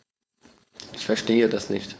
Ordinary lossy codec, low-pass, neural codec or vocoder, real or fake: none; none; codec, 16 kHz, 4.8 kbps, FACodec; fake